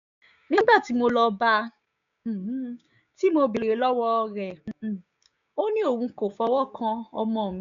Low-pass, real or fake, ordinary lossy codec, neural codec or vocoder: 7.2 kHz; fake; none; codec, 16 kHz, 6 kbps, DAC